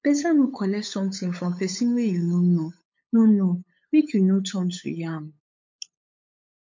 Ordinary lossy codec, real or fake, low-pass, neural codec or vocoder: MP3, 48 kbps; fake; 7.2 kHz; codec, 16 kHz, 8 kbps, FunCodec, trained on LibriTTS, 25 frames a second